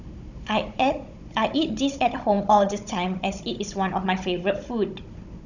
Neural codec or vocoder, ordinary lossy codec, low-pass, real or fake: codec, 16 kHz, 16 kbps, FunCodec, trained on Chinese and English, 50 frames a second; none; 7.2 kHz; fake